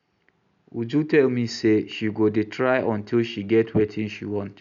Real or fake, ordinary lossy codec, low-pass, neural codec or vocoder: real; none; 7.2 kHz; none